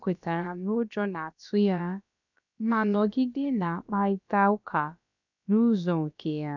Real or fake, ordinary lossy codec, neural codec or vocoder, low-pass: fake; none; codec, 16 kHz, about 1 kbps, DyCAST, with the encoder's durations; 7.2 kHz